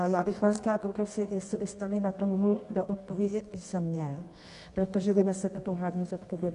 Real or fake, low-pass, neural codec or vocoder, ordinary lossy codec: fake; 10.8 kHz; codec, 24 kHz, 0.9 kbps, WavTokenizer, medium music audio release; AAC, 64 kbps